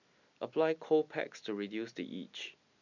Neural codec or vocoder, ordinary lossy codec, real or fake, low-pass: none; none; real; 7.2 kHz